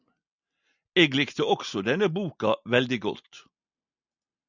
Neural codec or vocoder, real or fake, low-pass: none; real; 7.2 kHz